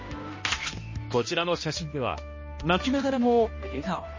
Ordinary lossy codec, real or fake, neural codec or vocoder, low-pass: MP3, 32 kbps; fake; codec, 16 kHz, 1 kbps, X-Codec, HuBERT features, trained on balanced general audio; 7.2 kHz